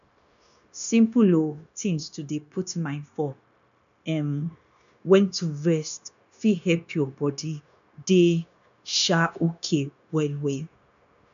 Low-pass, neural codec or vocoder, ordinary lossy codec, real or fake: 7.2 kHz; codec, 16 kHz, 0.9 kbps, LongCat-Audio-Codec; none; fake